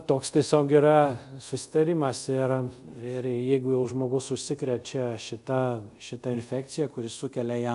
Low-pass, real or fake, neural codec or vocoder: 10.8 kHz; fake; codec, 24 kHz, 0.5 kbps, DualCodec